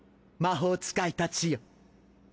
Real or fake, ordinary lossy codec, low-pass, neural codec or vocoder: real; none; none; none